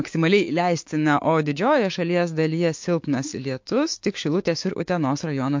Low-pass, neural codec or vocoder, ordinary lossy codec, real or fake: 7.2 kHz; codec, 16 kHz, 6 kbps, DAC; MP3, 48 kbps; fake